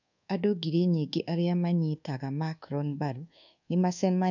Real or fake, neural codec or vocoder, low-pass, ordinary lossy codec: fake; codec, 24 kHz, 1.2 kbps, DualCodec; 7.2 kHz; none